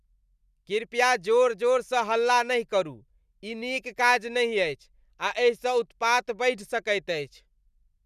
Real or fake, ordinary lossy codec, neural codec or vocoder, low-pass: real; none; none; 14.4 kHz